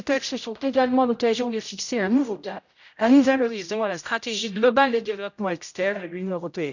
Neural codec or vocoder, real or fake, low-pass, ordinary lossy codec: codec, 16 kHz, 0.5 kbps, X-Codec, HuBERT features, trained on general audio; fake; 7.2 kHz; none